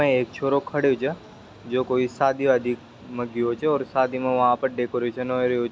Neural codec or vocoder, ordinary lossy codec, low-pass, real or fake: none; none; none; real